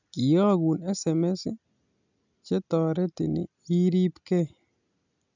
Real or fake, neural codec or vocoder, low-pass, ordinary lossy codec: real; none; 7.2 kHz; none